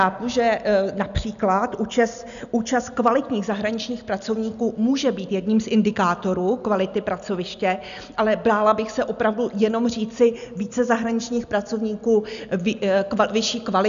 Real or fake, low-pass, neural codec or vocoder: real; 7.2 kHz; none